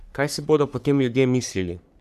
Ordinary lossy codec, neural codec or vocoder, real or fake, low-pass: none; codec, 44.1 kHz, 3.4 kbps, Pupu-Codec; fake; 14.4 kHz